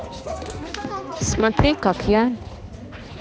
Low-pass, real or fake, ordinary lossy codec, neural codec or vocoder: none; fake; none; codec, 16 kHz, 2 kbps, X-Codec, HuBERT features, trained on balanced general audio